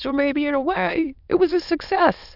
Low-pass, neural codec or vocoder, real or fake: 5.4 kHz; autoencoder, 22.05 kHz, a latent of 192 numbers a frame, VITS, trained on many speakers; fake